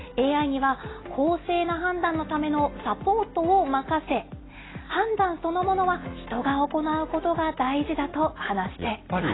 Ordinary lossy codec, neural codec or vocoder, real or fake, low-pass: AAC, 16 kbps; none; real; 7.2 kHz